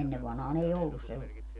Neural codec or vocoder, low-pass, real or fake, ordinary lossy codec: none; 10.8 kHz; real; none